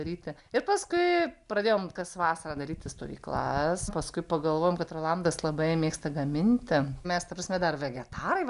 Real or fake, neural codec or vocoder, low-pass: real; none; 10.8 kHz